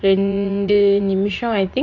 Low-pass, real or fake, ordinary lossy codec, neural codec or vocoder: 7.2 kHz; fake; AAC, 48 kbps; vocoder, 44.1 kHz, 80 mel bands, Vocos